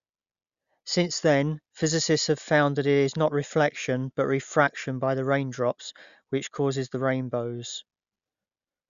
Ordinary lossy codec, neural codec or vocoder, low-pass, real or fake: Opus, 64 kbps; none; 7.2 kHz; real